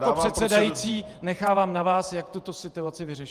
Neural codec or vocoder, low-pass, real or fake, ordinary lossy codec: vocoder, 48 kHz, 128 mel bands, Vocos; 14.4 kHz; fake; Opus, 32 kbps